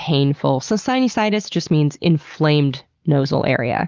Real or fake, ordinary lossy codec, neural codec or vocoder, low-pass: real; Opus, 24 kbps; none; 7.2 kHz